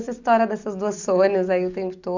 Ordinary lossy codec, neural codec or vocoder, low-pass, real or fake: none; none; 7.2 kHz; real